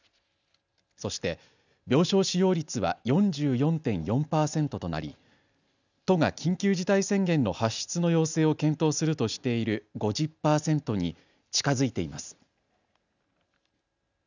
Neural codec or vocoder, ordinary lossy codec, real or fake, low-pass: none; none; real; 7.2 kHz